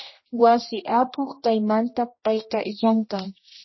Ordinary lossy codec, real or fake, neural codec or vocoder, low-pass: MP3, 24 kbps; fake; codec, 16 kHz, 1 kbps, X-Codec, HuBERT features, trained on general audio; 7.2 kHz